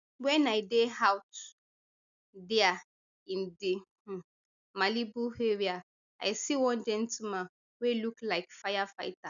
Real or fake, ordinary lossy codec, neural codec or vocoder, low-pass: real; none; none; 7.2 kHz